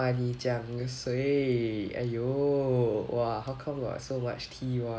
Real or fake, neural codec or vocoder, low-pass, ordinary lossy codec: real; none; none; none